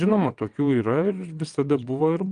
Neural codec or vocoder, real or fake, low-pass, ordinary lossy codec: vocoder, 22.05 kHz, 80 mel bands, WaveNeXt; fake; 9.9 kHz; Opus, 32 kbps